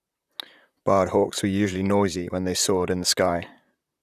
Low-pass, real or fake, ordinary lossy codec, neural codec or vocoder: 14.4 kHz; fake; none; vocoder, 44.1 kHz, 128 mel bands, Pupu-Vocoder